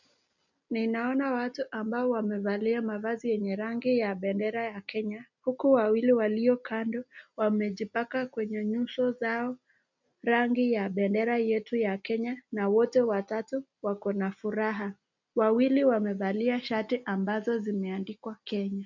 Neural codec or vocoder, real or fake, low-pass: none; real; 7.2 kHz